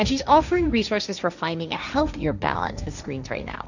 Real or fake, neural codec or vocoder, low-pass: fake; codec, 16 kHz, 1.1 kbps, Voila-Tokenizer; 7.2 kHz